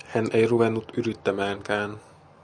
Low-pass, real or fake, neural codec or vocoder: 9.9 kHz; real; none